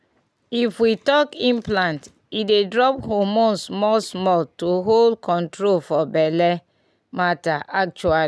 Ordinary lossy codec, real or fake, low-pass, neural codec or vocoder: none; real; none; none